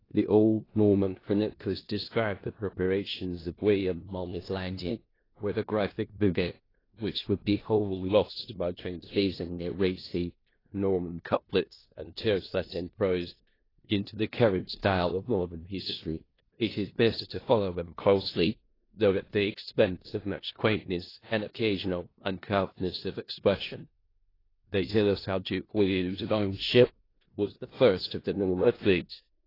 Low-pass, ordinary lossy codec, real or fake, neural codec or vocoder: 5.4 kHz; AAC, 24 kbps; fake; codec, 16 kHz in and 24 kHz out, 0.4 kbps, LongCat-Audio-Codec, four codebook decoder